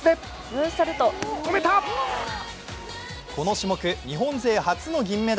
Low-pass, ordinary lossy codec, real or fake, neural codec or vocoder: none; none; real; none